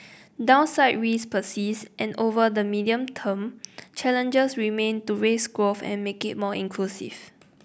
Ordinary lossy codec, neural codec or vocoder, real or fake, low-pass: none; none; real; none